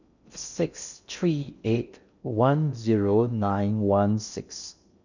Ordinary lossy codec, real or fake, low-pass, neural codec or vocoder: none; fake; 7.2 kHz; codec, 16 kHz in and 24 kHz out, 0.6 kbps, FocalCodec, streaming, 2048 codes